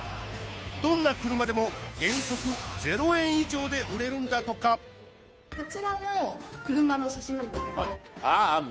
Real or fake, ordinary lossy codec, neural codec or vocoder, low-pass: fake; none; codec, 16 kHz, 2 kbps, FunCodec, trained on Chinese and English, 25 frames a second; none